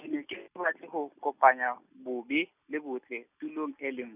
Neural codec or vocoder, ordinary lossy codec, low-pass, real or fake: none; none; 3.6 kHz; real